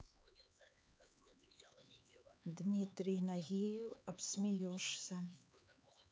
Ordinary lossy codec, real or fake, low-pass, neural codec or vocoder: none; fake; none; codec, 16 kHz, 4 kbps, X-Codec, HuBERT features, trained on LibriSpeech